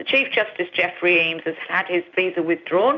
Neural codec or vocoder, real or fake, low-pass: none; real; 7.2 kHz